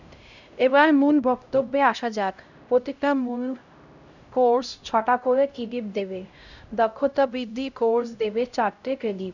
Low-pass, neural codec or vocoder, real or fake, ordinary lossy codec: 7.2 kHz; codec, 16 kHz, 0.5 kbps, X-Codec, HuBERT features, trained on LibriSpeech; fake; none